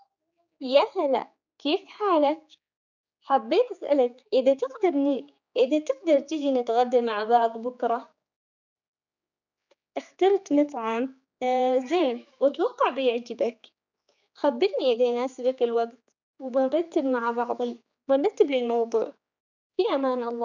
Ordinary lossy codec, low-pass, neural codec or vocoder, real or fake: none; 7.2 kHz; codec, 16 kHz, 4 kbps, X-Codec, HuBERT features, trained on general audio; fake